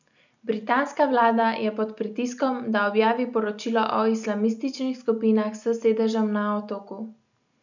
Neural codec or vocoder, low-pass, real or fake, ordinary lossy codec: none; 7.2 kHz; real; none